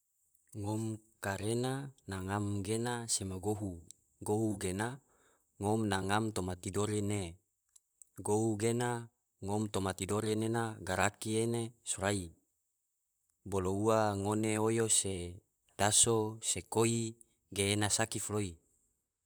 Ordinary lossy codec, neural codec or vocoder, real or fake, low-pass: none; vocoder, 44.1 kHz, 128 mel bands, Pupu-Vocoder; fake; none